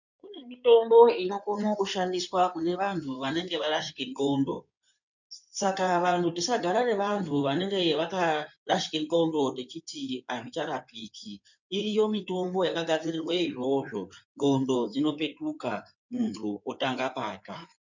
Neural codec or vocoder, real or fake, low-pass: codec, 16 kHz in and 24 kHz out, 2.2 kbps, FireRedTTS-2 codec; fake; 7.2 kHz